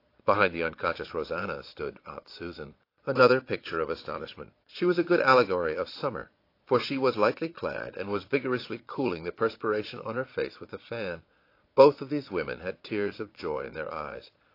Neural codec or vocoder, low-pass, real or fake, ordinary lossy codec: vocoder, 22.05 kHz, 80 mel bands, Vocos; 5.4 kHz; fake; AAC, 32 kbps